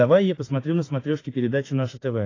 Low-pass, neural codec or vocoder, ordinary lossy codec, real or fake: 7.2 kHz; autoencoder, 48 kHz, 32 numbers a frame, DAC-VAE, trained on Japanese speech; AAC, 32 kbps; fake